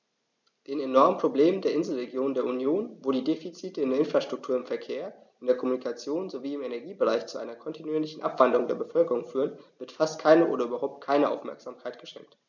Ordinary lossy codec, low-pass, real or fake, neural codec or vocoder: none; 7.2 kHz; real; none